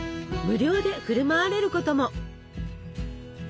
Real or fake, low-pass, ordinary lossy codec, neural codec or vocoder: real; none; none; none